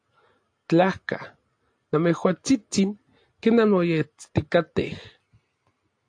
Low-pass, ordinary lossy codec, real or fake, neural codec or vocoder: 9.9 kHz; AAC, 48 kbps; real; none